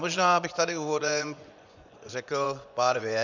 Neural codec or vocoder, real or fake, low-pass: vocoder, 24 kHz, 100 mel bands, Vocos; fake; 7.2 kHz